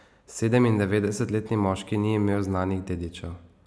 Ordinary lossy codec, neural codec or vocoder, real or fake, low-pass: none; none; real; none